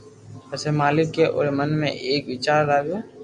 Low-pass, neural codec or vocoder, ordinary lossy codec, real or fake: 10.8 kHz; none; Opus, 64 kbps; real